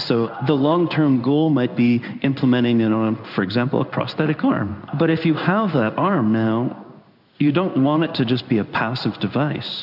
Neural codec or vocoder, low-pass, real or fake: codec, 16 kHz in and 24 kHz out, 1 kbps, XY-Tokenizer; 5.4 kHz; fake